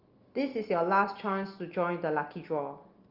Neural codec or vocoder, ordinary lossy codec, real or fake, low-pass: none; Opus, 24 kbps; real; 5.4 kHz